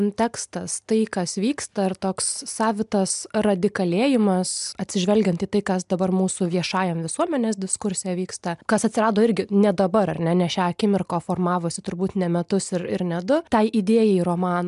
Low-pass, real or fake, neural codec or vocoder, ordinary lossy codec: 10.8 kHz; real; none; MP3, 96 kbps